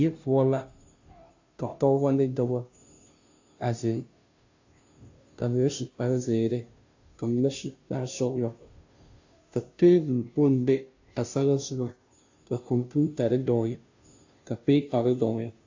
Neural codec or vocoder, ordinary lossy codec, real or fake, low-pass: codec, 16 kHz, 0.5 kbps, FunCodec, trained on Chinese and English, 25 frames a second; AAC, 48 kbps; fake; 7.2 kHz